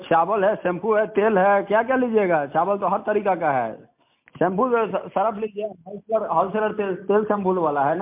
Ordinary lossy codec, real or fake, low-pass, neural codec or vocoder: MP3, 32 kbps; real; 3.6 kHz; none